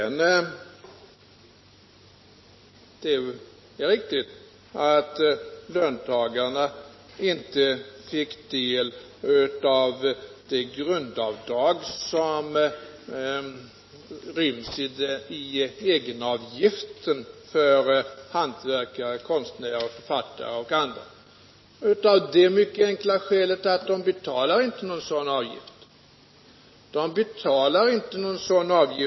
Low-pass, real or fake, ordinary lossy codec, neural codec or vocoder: 7.2 kHz; real; MP3, 24 kbps; none